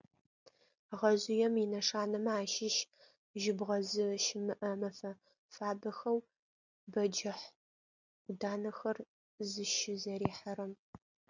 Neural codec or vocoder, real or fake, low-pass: none; real; 7.2 kHz